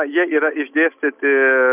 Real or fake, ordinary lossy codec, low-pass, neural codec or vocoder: real; AAC, 32 kbps; 3.6 kHz; none